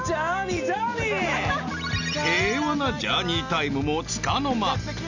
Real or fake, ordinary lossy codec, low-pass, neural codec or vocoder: real; none; 7.2 kHz; none